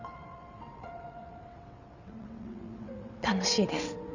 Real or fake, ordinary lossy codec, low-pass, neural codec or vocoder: fake; none; 7.2 kHz; vocoder, 22.05 kHz, 80 mel bands, WaveNeXt